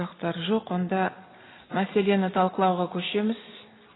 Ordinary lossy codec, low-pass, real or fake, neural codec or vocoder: AAC, 16 kbps; 7.2 kHz; real; none